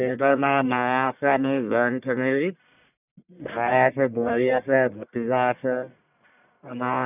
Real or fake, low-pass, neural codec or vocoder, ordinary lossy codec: fake; 3.6 kHz; codec, 44.1 kHz, 1.7 kbps, Pupu-Codec; none